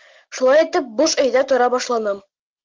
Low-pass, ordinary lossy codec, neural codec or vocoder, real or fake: 7.2 kHz; Opus, 32 kbps; none; real